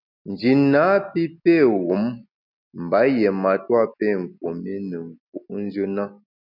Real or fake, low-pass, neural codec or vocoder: real; 5.4 kHz; none